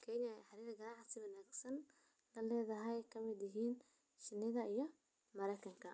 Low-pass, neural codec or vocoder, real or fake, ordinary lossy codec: none; none; real; none